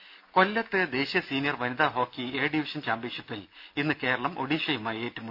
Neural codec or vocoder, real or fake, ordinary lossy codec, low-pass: none; real; none; 5.4 kHz